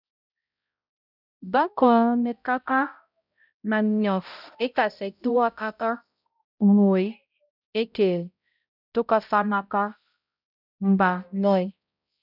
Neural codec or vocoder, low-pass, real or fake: codec, 16 kHz, 0.5 kbps, X-Codec, HuBERT features, trained on balanced general audio; 5.4 kHz; fake